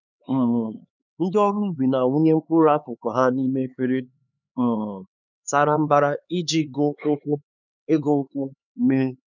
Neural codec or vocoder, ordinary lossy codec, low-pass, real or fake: codec, 16 kHz, 4 kbps, X-Codec, HuBERT features, trained on LibriSpeech; none; 7.2 kHz; fake